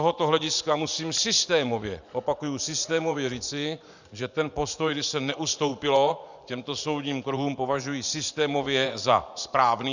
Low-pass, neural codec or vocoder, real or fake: 7.2 kHz; vocoder, 24 kHz, 100 mel bands, Vocos; fake